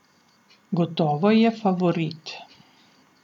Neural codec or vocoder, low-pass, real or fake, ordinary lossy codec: none; 19.8 kHz; real; none